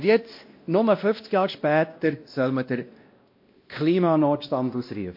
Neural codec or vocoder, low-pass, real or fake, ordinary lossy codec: codec, 16 kHz, 1 kbps, X-Codec, WavLM features, trained on Multilingual LibriSpeech; 5.4 kHz; fake; MP3, 32 kbps